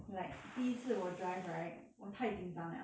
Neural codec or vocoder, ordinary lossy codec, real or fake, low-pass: none; none; real; none